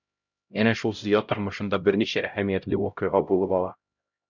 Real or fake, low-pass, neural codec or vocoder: fake; 7.2 kHz; codec, 16 kHz, 0.5 kbps, X-Codec, HuBERT features, trained on LibriSpeech